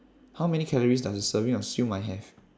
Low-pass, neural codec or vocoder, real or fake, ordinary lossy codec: none; none; real; none